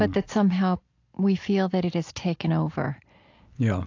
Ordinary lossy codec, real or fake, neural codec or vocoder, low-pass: AAC, 48 kbps; real; none; 7.2 kHz